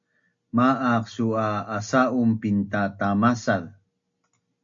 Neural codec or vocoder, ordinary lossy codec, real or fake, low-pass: none; AAC, 64 kbps; real; 7.2 kHz